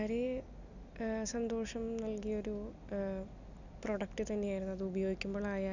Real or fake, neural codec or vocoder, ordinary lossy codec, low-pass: real; none; none; 7.2 kHz